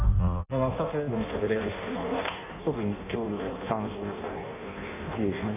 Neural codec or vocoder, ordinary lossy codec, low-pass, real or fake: codec, 16 kHz in and 24 kHz out, 0.6 kbps, FireRedTTS-2 codec; AAC, 32 kbps; 3.6 kHz; fake